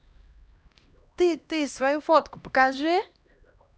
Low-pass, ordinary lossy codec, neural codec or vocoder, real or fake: none; none; codec, 16 kHz, 1 kbps, X-Codec, HuBERT features, trained on LibriSpeech; fake